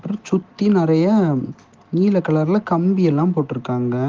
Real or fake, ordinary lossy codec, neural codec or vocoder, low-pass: real; Opus, 16 kbps; none; 7.2 kHz